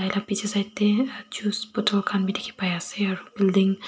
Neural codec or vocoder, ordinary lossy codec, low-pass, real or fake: none; none; none; real